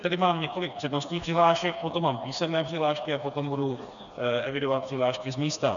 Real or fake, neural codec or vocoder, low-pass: fake; codec, 16 kHz, 2 kbps, FreqCodec, smaller model; 7.2 kHz